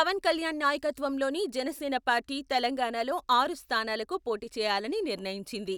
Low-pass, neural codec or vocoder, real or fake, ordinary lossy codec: none; none; real; none